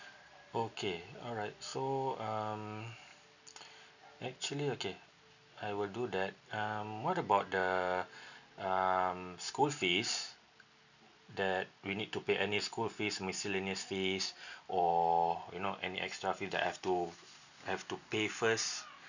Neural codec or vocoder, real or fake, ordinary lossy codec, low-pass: none; real; none; 7.2 kHz